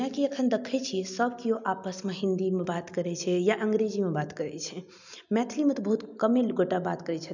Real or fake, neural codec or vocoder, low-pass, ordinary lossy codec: real; none; 7.2 kHz; none